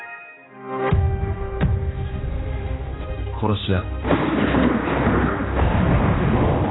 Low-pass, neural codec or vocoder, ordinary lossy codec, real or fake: 7.2 kHz; codec, 16 kHz, 2 kbps, X-Codec, HuBERT features, trained on general audio; AAC, 16 kbps; fake